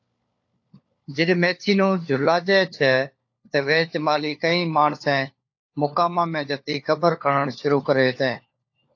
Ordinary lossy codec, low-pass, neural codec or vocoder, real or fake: AAC, 48 kbps; 7.2 kHz; codec, 16 kHz, 4 kbps, FunCodec, trained on LibriTTS, 50 frames a second; fake